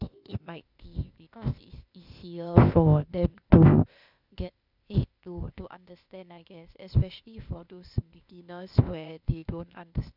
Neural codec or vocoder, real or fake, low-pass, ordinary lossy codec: codec, 16 kHz, 0.8 kbps, ZipCodec; fake; 5.4 kHz; none